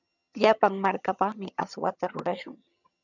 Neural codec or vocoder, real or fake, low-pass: vocoder, 22.05 kHz, 80 mel bands, HiFi-GAN; fake; 7.2 kHz